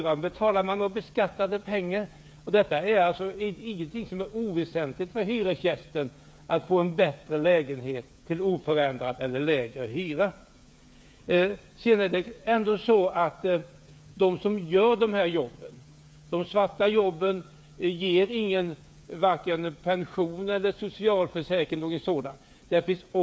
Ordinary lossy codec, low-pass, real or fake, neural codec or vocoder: none; none; fake; codec, 16 kHz, 8 kbps, FreqCodec, smaller model